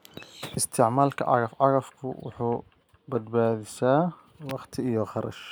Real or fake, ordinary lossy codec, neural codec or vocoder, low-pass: real; none; none; none